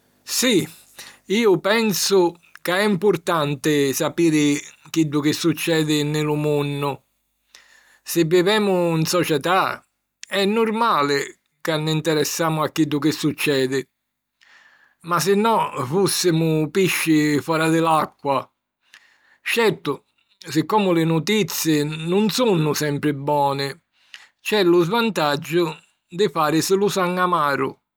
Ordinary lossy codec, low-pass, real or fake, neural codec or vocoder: none; none; real; none